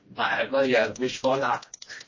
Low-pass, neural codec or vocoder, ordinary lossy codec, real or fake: 7.2 kHz; codec, 16 kHz, 1 kbps, FreqCodec, smaller model; MP3, 32 kbps; fake